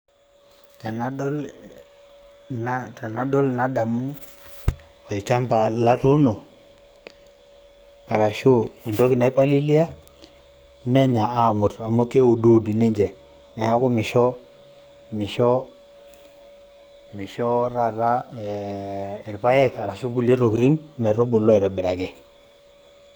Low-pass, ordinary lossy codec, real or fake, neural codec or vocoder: none; none; fake; codec, 44.1 kHz, 2.6 kbps, SNAC